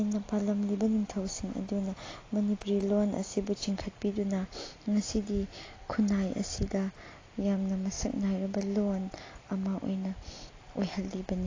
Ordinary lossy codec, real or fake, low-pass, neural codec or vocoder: AAC, 32 kbps; real; 7.2 kHz; none